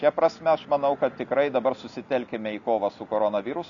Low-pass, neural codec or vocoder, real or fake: 7.2 kHz; none; real